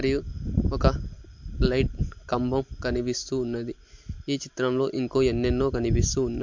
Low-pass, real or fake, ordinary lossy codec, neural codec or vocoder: 7.2 kHz; real; MP3, 48 kbps; none